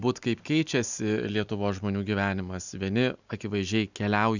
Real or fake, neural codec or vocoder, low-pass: real; none; 7.2 kHz